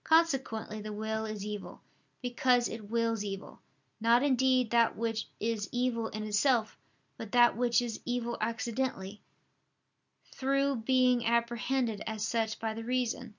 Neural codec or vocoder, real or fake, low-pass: none; real; 7.2 kHz